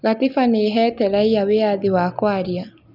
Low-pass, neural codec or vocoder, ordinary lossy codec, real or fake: 5.4 kHz; none; none; real